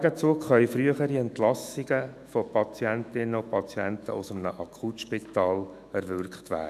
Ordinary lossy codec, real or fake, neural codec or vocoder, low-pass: none; fake; autoencoder, 48 kHz, 128 numbers a frame, DAC-VAE, trained on Japanese speech; 14.4 kHz